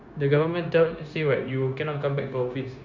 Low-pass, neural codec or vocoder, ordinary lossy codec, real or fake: 7.2 kHz; codec, 16 kHz, 0.9 kbps, LongCat-Audio-Codec; Opus, 64 kbps; fake